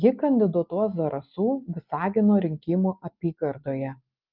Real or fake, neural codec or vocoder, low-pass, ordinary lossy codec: real; none; 5.4 kHz; Opus, 32 kbps